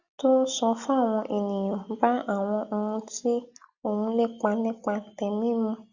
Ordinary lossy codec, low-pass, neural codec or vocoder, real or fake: Opus, 64 kbps; 7.2 kHz; none; real